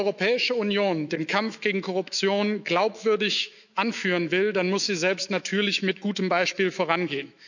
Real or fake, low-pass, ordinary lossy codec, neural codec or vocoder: fake; 7.2 kHz; none; autoencoder, 48 kHz, 128 numbers a frame, DAC-VAE, trained on Japanese speech